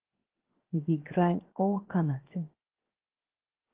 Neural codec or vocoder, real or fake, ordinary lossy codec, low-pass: codec, 16 kHz, 0.7 kbps, FocalCodec; fake; Opus, 16 kbps; 3.6 kHz